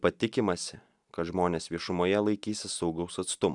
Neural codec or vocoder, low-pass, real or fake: none; 10.8 kHz; real